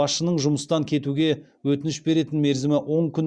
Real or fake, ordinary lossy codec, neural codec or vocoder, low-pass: real; Opus, 64 kbps; none; 9.9 kHz